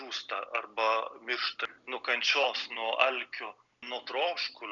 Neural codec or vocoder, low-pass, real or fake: none; 7.2 kHz; real